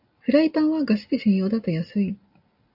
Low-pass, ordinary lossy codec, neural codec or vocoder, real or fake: 5.4 kHz; AAC, 48 kbps; none; real